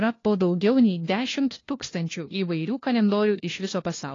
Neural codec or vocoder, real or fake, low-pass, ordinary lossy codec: codec, 16 kHz, 1 kbps, FunCodec, trained on LibriTTS, 50 frames a second; fake; 7.2 kHz; AAC, 32 kbps